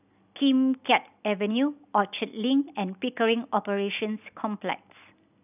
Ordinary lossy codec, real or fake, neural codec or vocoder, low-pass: none; real; none; 3.6 kHz